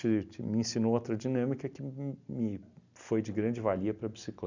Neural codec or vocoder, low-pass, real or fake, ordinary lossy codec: none; 7.2 kHz; real; none